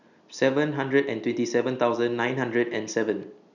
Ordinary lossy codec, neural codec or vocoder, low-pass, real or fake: none; none; 7.2 kHz; real